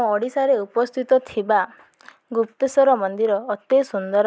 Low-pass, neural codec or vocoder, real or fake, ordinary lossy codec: none; none; real; none